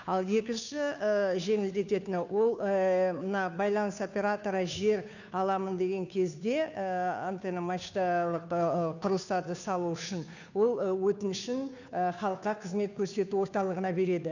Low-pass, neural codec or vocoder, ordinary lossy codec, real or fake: 7.2 kHz; codec, 16 kHz, 2 kbps, FunCodec, trained on Chinese and English, 25 frames a second; none; fake